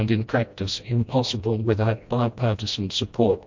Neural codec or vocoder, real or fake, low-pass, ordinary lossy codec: codec, 16 kHz, 1 kbps, FreqCodec, smaller model; fake; 7.2 kHz; MP3, 48 kbps